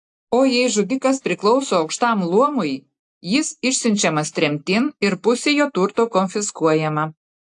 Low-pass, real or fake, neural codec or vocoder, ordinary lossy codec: 10.8 kHz; real; none; AAC, 64 kbps